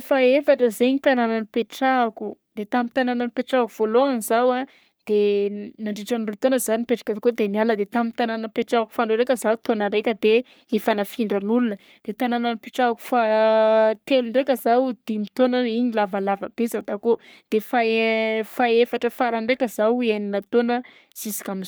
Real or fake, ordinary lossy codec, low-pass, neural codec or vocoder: fake; none; none; codec, 44.1 kHz, 3.4 kbps, Pupu-Codec